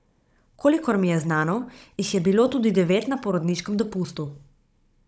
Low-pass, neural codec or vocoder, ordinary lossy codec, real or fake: none; codec, 16 kHz, 16 kbps, FunCodec, trained on Chinese and English, 50 frames a second; none; fake